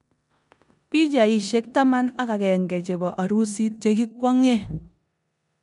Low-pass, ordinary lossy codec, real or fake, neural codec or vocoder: 10.8 kHz; none; fake; codec, 16 kHz in and 24 kHz out, 0.9 kbps, LongCat-Audio-Codec, four codebook decoder